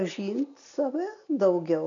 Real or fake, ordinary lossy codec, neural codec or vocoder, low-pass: real; MP3, 96 kbps; none; 7.2 kHz